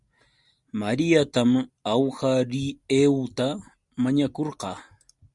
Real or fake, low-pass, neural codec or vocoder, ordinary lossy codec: real; 10.8 kHz; none; Opus, 64 kbps